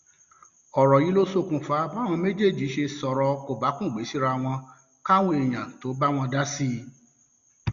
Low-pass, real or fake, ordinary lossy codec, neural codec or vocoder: 7.2 kHz; real; Opus, 64 kbps; none